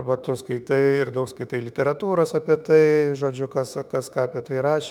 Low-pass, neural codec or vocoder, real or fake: 19.8 kHz; autoencoder, 48 kHz, 32 numbers a frame, DAC-VAE, trained on Japanese speech; fake